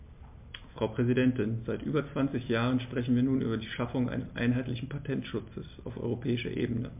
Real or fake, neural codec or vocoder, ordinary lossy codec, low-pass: real; none; MP3, 32 kbps; 3.6 kHz